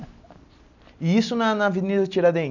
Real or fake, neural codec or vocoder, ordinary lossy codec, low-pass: real; none; none; 7.2 kHz